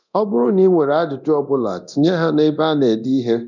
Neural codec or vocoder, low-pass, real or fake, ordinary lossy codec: codec, 24 kHz, 0.9 kbps, DualCodec; 7.2 kHz; fake; none